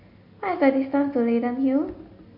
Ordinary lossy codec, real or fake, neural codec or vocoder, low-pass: MP3, 32 kbps; real; none; 5.4 kHz